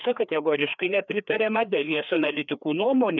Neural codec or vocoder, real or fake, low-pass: codec, 16 kHz, 2 kbps, FreqCodec, larger model; fake; 7.2 kHz